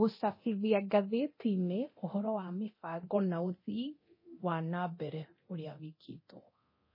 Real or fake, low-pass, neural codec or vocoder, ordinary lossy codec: fake; 5.4 kHz; codec, 24 kHz, 0.9 kbps, DualCodec; MP3, 24 kbps